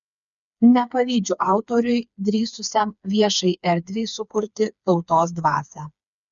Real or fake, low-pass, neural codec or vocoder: fake; 7.2 kHz; codec, 16 kHz, 4 kbps, FreqCodec, smaller model